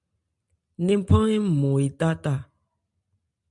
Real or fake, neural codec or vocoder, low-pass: real; none; 10.8 kHz